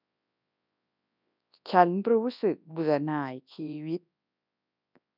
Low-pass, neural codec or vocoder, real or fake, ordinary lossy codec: 5.4 kHz; codec, 24 kHz, 0.9 kbps, WavTokenizer, large speech release; fake; none